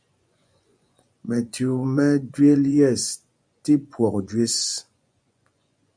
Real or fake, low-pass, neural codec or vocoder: fake; 9.9 kHz; vocoder, 24 kHz, 100 mel bands, Vocos